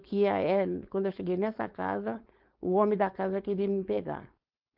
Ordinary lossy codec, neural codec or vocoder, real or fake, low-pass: Opus, 24 kbps; codec, 16 kHz, 4.8 kbps, FACodec; fake; 5.4 kHz